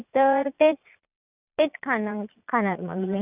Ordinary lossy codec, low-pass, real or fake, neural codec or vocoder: none; 3.6 kHz; fake; vocoder, 22.05 kHz, 80 mel bands, Vocos